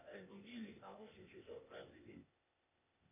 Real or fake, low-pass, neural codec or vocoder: fake; 3.6 kHz; codec, 16 kHz, 0.8 kbps, ZipCodec